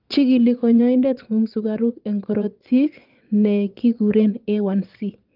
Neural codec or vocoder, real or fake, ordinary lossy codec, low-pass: vocoder, 22.05 kHz, 80 mel bands, Vocos; fake; Opus, 32 kbps; 5.4 kHz